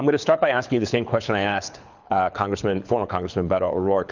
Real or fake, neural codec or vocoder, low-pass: fake; codec, 24 kHz, 6 kbps, HILCodec; 7.2 kHz